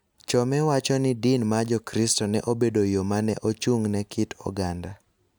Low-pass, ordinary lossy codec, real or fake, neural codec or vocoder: none; none; real; none